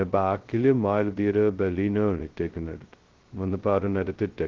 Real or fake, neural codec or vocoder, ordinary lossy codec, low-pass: fake; codec, 16 kHz, 0.2 kbps, FocalCodec; Opus, 16 kbps; 7.2 kHz